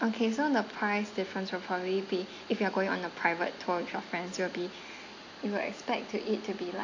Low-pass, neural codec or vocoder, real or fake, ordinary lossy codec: 7.2 kHz; none; real; AAC, 48 kbps